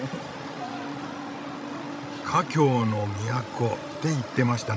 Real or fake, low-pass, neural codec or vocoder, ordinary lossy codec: fake; none; codec, 16 kHz, 16 kbps, FreqCodec, larger model; none